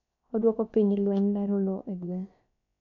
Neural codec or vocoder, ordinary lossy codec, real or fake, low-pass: codec, 16 kHz, about 1 kbps, DyCAST, with the encoder's durations; none; fake; 7.2 kHz